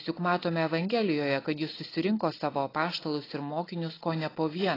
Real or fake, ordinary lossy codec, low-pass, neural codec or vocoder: real; AAC, 24 kbps; 5.4 kHz; none